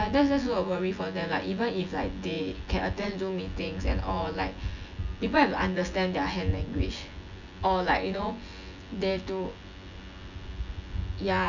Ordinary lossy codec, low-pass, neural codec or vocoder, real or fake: none; 7.2 kHz; vocoder, 24 kHz, 100 mel bands, Vocos; fake